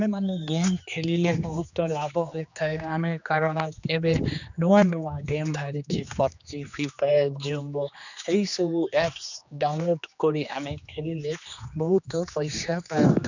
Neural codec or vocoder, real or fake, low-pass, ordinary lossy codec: codec, 16 kHz, 2 kbps, X-Codec, HuBERT features, trained on general audio; fake; 7.2 kHz; none